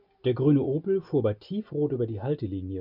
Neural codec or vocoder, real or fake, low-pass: none; real; 5.4 kHz